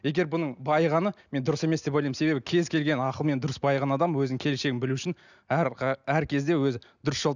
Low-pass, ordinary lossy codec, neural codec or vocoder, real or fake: 7.2 kHz; none; none; real